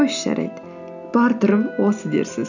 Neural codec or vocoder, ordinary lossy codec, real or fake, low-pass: none; none; real; 7.2 kHz